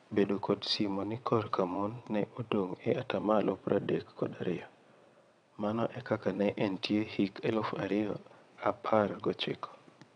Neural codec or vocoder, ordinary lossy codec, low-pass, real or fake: vocoder, 22.05 kHz, 80 mel bands, WaveNeXt; none; 9.9 kHz; fake